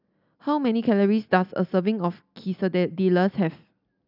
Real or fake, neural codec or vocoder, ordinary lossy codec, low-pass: real; none; none; 5.4 kHz